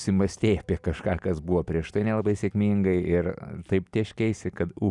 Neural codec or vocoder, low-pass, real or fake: none; 10.8 kHz; real